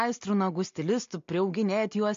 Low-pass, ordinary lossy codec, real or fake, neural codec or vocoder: 7.2 kHz; MP3, 48 kbps; real; none